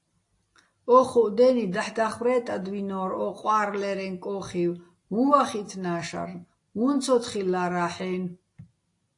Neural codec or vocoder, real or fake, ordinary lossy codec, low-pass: none; real; AAC, 48 kbps; 10.8 kHz